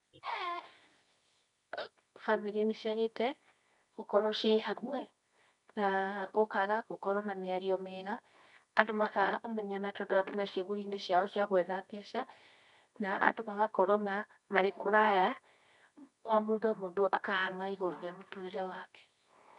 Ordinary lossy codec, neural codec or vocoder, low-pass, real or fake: none; codec, 24 kHz, 0.9 kbps, WavTokenizer, medium music audio release; 10.8 kHz; fake